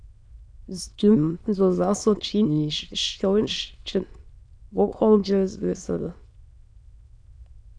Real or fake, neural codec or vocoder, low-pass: fake; autoencoder, 22.05 kHz, a latent of 192 numbers a frame, VITS, trained on many speakers; 9.9 kHz